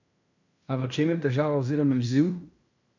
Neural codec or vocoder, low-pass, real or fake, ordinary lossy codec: codec, 16 kHz in and 24 kHz out, 0.9 kbps, LongCat-Audio-Codec, fine tuned four codebook decoder; 7.2 kHz; fake; none